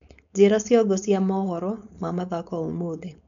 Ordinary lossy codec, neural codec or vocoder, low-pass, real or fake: none; codec, 16 kHz, 4.8 kbps, FACodec; 7.2 kHz; fake